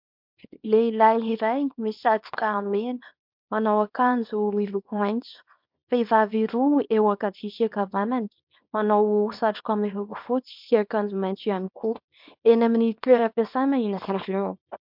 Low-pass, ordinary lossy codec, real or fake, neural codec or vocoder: 5.4 kHz; MP3, 48 kbps; fake; codec, 24 kHz, 0.9 kbps, WavTokenizer, small release